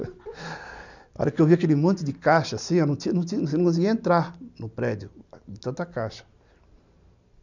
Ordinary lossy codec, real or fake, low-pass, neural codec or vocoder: none; real; 7.2 kHz; none